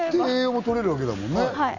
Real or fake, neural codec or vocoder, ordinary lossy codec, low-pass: real; none; none; 7.2 kHz